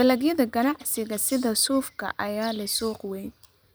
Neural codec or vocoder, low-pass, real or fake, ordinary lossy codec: vocoder, 44.1 kHz, 128 mel bands every 256 samples, BigVGAN v2; none; fake; none